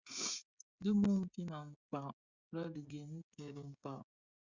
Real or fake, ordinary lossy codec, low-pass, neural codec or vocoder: fake; Opus, 64 kbps; 7.2 kHz; codec, 16 kHz, 16 kbps, FreqCodec, smaller model